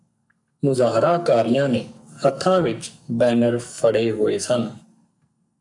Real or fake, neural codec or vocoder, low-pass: fake; codec, 44.1 kHz, 2.6 kbps, SNAC; 10.8 kHz